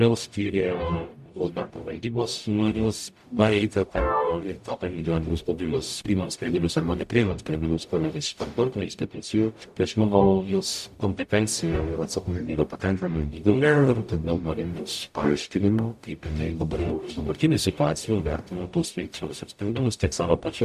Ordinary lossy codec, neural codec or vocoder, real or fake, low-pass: AAC, 96 kbps; codec, 44.1 kHz, 0.9 kbps, DAC; fake; 14.4 kHz